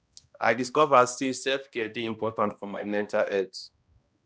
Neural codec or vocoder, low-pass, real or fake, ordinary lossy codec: codec, 16 kHz, 1 kbps, X-Codec, HuBERT features, trained on balanced general audio; none; fake; none